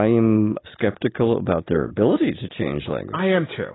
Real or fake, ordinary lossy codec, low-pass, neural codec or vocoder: real; AAC, 16 kbps; 7.2 kHz; none